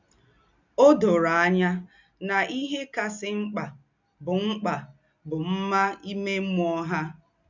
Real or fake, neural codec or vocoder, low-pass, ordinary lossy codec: real; none; 7.2 kHz; none